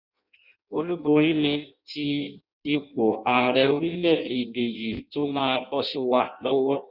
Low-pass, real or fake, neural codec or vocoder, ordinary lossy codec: 5.4 kHz; fake; codec, 16 kHz in and 24 kHz out, 0.6 kbps, FireRedTTS-2 codec; none